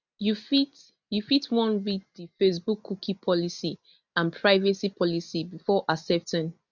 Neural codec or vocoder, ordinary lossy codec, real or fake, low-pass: none; none; real; 7.2 kHz